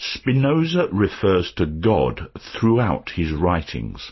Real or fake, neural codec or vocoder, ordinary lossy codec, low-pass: real; none; MP3, 24 kbps; 7.2 kHz